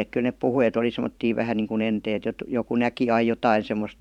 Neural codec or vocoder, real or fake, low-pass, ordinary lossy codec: vocoder, 44.1 kHz, 128 mel bands every 512 samples, BigVGAN v2; fake; 19.8 kHz; none